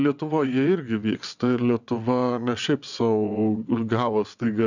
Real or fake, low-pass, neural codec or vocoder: fake; 7.2 kHz; vocoder, 22.05 kHz, 80 mel bands, WaveNeXt